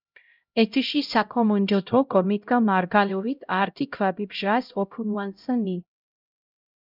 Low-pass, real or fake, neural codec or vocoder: 5.4 kHz; fake; codec, 16 kHz, 0.5 kbps, X-Codec, HuBERT features, trained on LibriSpeech